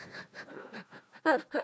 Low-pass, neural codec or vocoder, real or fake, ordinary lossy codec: none; codec, 16 kHz, 1 kbps, FunCodec, trained on Chinese and English, 50 frames a second; fake; none